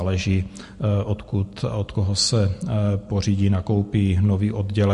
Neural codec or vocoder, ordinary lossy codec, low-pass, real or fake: none; MP3, 48 kbps; 14.4 kHz; real